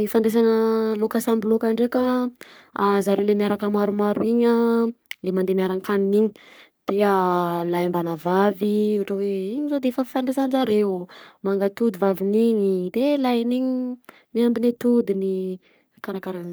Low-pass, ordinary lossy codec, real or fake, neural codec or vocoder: none; none; fake; codec, 44.1 kHz, 3.4 kbps, Pupu-Codec